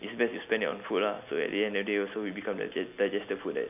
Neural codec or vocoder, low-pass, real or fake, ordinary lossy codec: none; 3.6 kHz; real; none